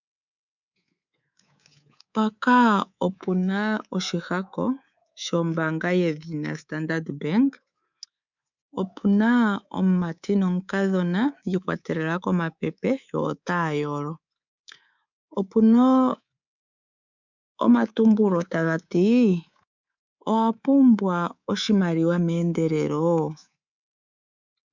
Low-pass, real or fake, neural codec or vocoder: 7.2 kHz; fake; codec, 24 kHz, 3.1 kbps, DualCodec